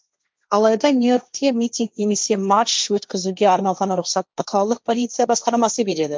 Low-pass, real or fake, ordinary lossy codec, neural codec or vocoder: none; fake; none; codec, 16 kHz, 1.1 kbps, Voila-Tokenizer